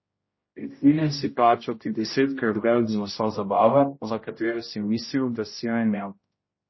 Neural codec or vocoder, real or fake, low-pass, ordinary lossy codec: codec, 16 kHz, 0.5 kbps, X-Codec, HuBERT features, trained on general audio; fake; 7.2 kHz; MP3, 24 kbps